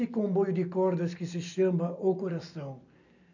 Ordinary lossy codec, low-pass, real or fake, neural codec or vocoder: none; 7.2 kHz; real; none